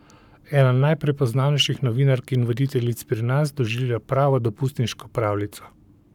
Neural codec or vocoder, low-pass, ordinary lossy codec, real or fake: codec, 44.1 kHz, 7.8 kbps, Pupu-Codec; 19.8 kHz; none; fake